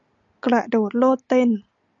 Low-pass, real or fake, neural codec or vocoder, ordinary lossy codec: 7.2 kHz; real; none; MP3, 96 kbps